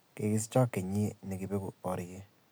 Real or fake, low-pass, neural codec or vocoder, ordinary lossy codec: real; none; none; none